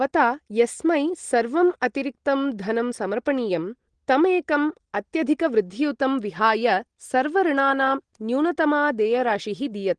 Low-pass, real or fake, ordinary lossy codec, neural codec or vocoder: 9.9 kHz; real; Opus, 16 kbps; none